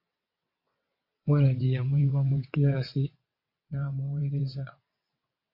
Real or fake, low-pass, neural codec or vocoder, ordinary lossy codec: fake; 5.4 kHz; vocoder, 22.05 kHz, 80 mel bands, WaveNeXt; MP3, 32 kbps